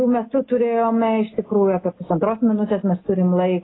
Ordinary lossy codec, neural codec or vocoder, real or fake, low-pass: AAC, 16 kbps; none; real; 7.2 kHz